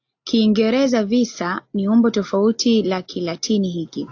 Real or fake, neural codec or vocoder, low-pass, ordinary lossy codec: real; none; 7.2 kHz; AAC, 48 kbps